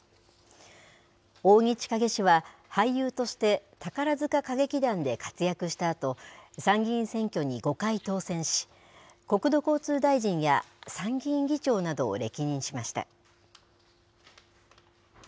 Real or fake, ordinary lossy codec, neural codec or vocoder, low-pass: real; none; none; none